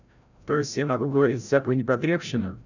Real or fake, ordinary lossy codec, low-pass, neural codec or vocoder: fake; none; 7.2 kHz; codec, 16 kHz, 0.5 kbps, FreqCodec, larger model